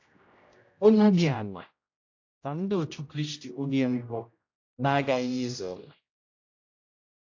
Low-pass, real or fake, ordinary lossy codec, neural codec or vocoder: 7.2 kHz; fake; AAC, 48 kbps; codec, 16 kHz, 0.5 kbps, X-Codec, HuBERT features, trained on general audio